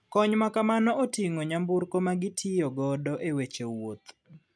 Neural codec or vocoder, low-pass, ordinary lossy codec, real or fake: none; none; none; real